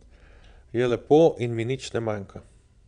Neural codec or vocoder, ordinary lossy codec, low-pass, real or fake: vocoder, 22.05 kHz, 80 mel bands, Vocos; none; 9.9 kHz; fake